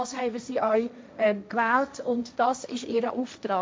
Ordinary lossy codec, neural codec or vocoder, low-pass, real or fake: none; codec, 16 kHz, 1.1 kbps, Voila-Tokenizer; none; fake